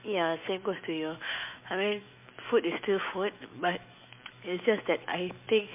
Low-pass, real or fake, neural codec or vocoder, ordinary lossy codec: 3.6 kHz; fake; autoencoder, 48 kHz, 128 numbers a frame, DAC-VAE, trained on Japanese speech; MP3, 24 kbps